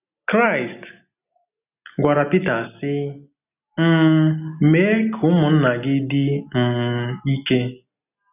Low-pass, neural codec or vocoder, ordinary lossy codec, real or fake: 3.6 kHz; none; none; real